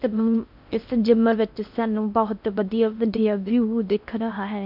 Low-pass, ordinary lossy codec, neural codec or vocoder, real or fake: 5.4 kHz; none; codec, 16 kHz in and 24 kHz out, 0.6 kbps, FocalCodec, streaming, 4096 codes; fake